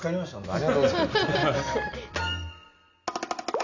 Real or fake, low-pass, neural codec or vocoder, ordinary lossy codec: real; 7.2 kHz; none; none